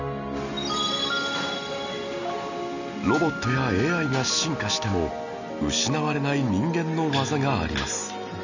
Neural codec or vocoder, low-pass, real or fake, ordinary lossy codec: none; 7.2 kHz; real; none